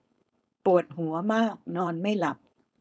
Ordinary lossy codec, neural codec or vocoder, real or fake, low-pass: none; codec, 16 kHz, 4.8 kbps, FACodec; fake; none